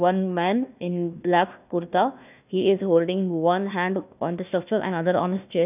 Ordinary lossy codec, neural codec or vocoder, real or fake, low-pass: none; codec, 16 kHz, 1 kbps, FunCodec, trained on Chinese and English, 50 frames a second; fake; 3.6 kHz